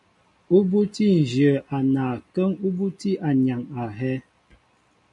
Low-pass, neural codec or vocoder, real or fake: 10.8 kHz; none; real